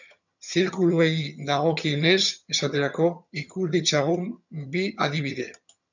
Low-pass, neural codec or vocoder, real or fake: 7.2 kHz; vocoder, 22.05 kHz, 80 mel bands, HiFi-GAN; fake